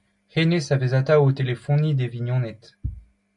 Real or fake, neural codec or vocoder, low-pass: real; none; 10.8 kHz